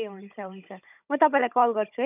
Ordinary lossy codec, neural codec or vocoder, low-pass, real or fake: none; codec, 16 kHz, 16 kbps, FunCodec, trained on Chinese and English, 50 frames a second; 3.6 kHz; fake